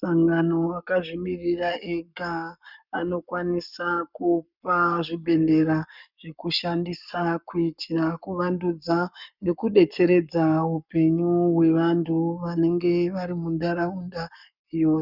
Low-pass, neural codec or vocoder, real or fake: 5.4 kHz; codec, 44.1 kHz, 7.8 kbps, Pupu-Codec; fake